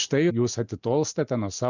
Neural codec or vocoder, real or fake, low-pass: none; real; 7.2 kHz